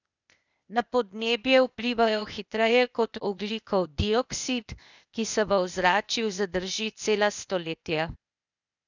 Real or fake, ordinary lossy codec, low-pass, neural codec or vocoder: fake; none; 7.2 kHz; codec, 16 kHz, 0.8 kbps, ZipCodec